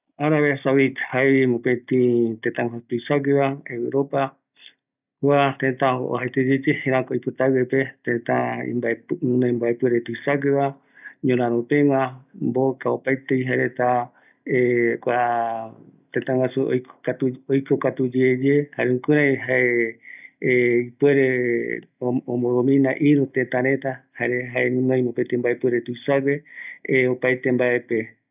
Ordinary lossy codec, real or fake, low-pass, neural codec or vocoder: none; real; 3.6 kHz; none